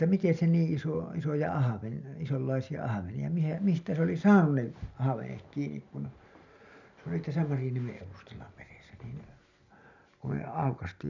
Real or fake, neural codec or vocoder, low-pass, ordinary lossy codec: real; none; 7.2 kHz; none